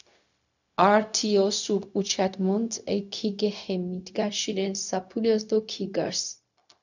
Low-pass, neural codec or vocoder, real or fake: 7.2 kHz; codec, 16 kHz, 0.4 kbps, LongCat-Audio-Codec; fake